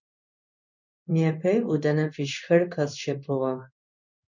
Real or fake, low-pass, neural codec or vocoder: fake; 7.2 kHz; codec, 16 kHz in and 24 kHz out, 1 kbps, XY-Tokenizer